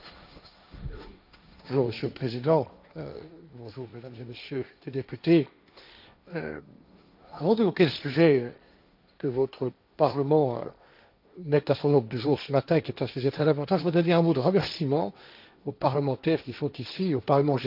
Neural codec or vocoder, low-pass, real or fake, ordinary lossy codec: codec, 16 kHz, 1.1 kbps, Voila-Tokenizer; 5.4 kHz; fake; none